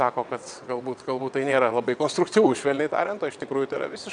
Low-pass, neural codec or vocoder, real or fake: 9.9 kHz; vocoder, 22.05 kHz, 80 mel bands, WaveNeXt; fake